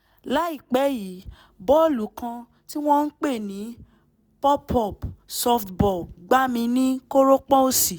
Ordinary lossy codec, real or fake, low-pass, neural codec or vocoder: none; real; none; none